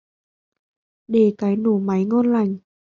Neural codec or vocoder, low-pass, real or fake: none; 7.2 kHz; real